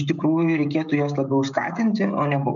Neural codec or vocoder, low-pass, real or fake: codec, 16 kHz, 16 kbps, FreqCodec, smaller model; 7.2 kHz; fake